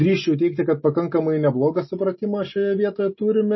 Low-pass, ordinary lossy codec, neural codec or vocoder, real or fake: 7.2 kHz; MP3, 24 kbps; none; real